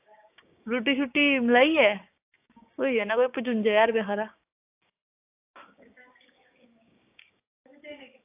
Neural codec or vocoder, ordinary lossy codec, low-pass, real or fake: codec, 16 kHz, 6 kbps, DAC; AAC, 32 kbps; 3.6 kHz; fake